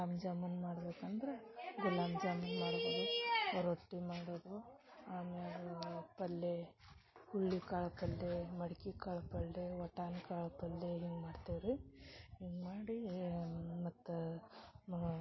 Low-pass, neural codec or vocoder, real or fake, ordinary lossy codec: 7.2 kHz; none; real; MP3, 24 kbps